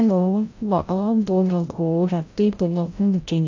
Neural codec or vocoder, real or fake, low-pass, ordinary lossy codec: codec, 16 kHz, 0.5 kbps, FreqCodec, larger model; fake; 7.2 kHz; AAC, 48 kbps